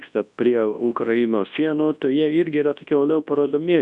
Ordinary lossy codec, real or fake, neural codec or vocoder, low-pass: MP3, 96 kbps; fake; codec, 24 kHz, 0.9 kbps, WavTokenizer, large speech release; 10.8 kHz